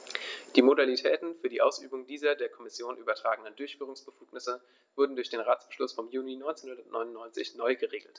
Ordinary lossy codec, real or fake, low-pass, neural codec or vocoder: none; real; none; none